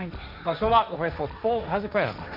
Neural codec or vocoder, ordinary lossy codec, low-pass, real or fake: codec, 16 kHz, 1.1 kbps, Voila-Tokenizer; none; 5.4 kHz; fake